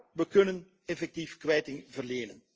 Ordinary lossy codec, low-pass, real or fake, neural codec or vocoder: Opus, 24 kbps; 7.2 kHz; real; none